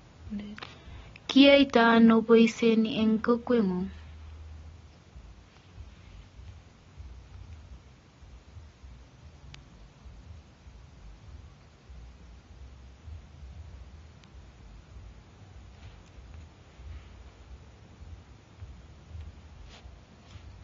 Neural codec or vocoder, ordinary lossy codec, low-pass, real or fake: none; AAC, 24 kbps; 7.2 kHz; real